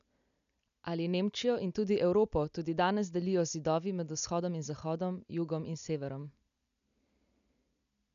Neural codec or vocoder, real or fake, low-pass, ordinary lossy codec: none; real; 7.2 kHz; none